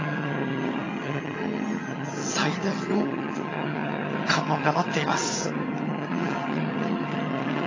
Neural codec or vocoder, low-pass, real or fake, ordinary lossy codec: vocoder, 22.05 kHz, 80 mel bands, HiFi-GAN; 7.2 kHz; fake; AAC, 32 kbps